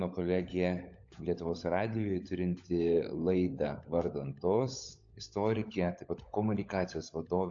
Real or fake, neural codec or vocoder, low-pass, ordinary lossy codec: fake; codec, 16 kHz, 16 kbps, FunCodec, trained on LibriTTS, 50 frames a second; 7.2 kHz; AAC, 64 kbps